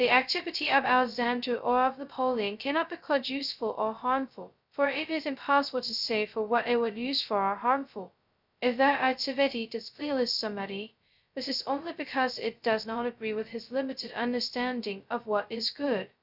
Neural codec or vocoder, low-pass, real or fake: codec, 16 kHz, 0.2 kbps, FocalCodec; 5.4 kHz; fake